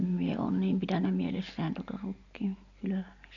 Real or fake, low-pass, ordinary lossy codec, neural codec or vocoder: real; 7.2 kHz; none; none